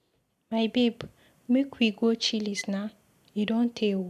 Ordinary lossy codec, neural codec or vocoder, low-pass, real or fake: none; none; 14.4 kHz; real